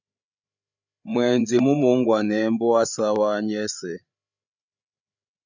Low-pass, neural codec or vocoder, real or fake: 7.2 kHz; codec, 16 kHz, 8 kbps, FreqCodec, larger model; fake